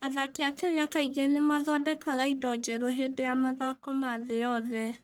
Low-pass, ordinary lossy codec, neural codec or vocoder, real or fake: none; none; codec, 44.1 kHz, 1.7 kbps, Pupu-Codec; fake